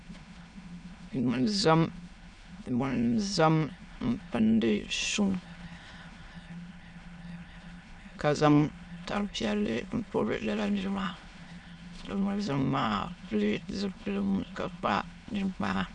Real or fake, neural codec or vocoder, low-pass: fake; autoencoder, 22.05 kHz, a latent of 192 numbers a frame, VITS, trained on many speakers; 9.9 kHz